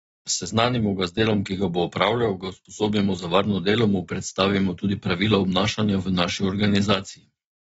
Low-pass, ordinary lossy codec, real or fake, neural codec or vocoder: 19.8 kHz; AAC, 24 kbps; real; none